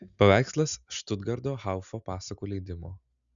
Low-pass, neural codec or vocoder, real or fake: 7.2 kHz; none; real